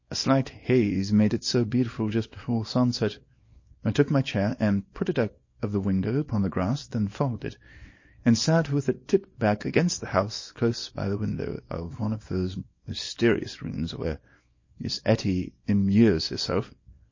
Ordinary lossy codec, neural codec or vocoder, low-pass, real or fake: MP3, 32 kbps; codec, 24 kHz, 0.9 kbps, WavTokenizer, small release; 7.2 kHz; fake